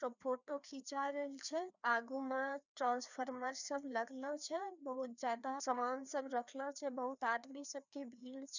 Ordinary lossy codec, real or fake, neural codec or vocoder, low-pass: none; fake; codec, 16 kHz, 2 kbps, FreqCodec, larger model; 7.2 kHz